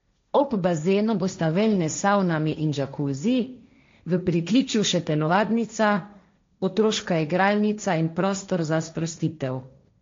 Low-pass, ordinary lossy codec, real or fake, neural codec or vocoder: 7.2 kHz; MP3, 48 kbps; fake; codec, 16 kHz, 1.1 kbps, Voila-Tokenizer